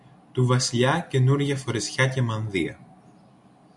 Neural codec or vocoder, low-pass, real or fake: none; 10.8 kHz; real